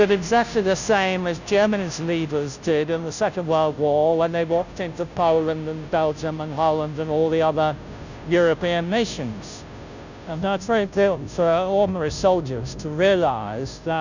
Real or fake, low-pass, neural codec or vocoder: fake; 7.2 kHz; codec, 16 kHz, 0.5 kbps, FunCodec, trained on Chinese and English, 25 frames a second